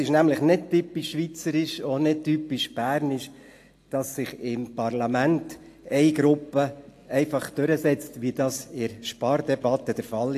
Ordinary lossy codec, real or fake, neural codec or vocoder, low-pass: AAC, 64 kbps; real; none; 14.4 kHz